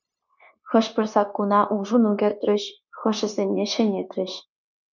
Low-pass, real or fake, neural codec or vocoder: 7.2 kHz; fake; codec, 16 kHz, 0.9 kbps, LongCat-Audio-Codec